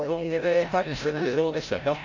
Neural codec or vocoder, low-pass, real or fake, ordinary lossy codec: codec, 16 kHz, 0.5 kbps, FreqCodec, larger model; 7.2 kHz; fake; none